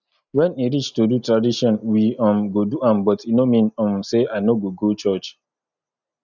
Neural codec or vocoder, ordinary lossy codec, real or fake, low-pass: none; none; real; 7.2 kHz